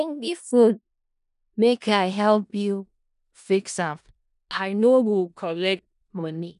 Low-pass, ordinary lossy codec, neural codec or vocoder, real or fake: 10.8 kHz; none; codec, 16 kHz in and 24 kHz out, 0.4 kbps, LongCat-Audio-Codec, four codebook decoder; fake